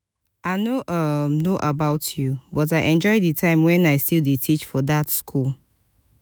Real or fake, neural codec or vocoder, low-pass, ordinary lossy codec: fake; autoencoder, 48 kHz, 128 numbers a frame, DAC-VAE, trained on Japanese speech; none; none